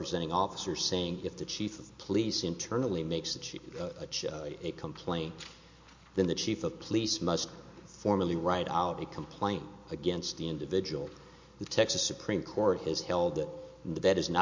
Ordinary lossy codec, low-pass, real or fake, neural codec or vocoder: MP3, 48 kbps; 7.2 kHz; real; none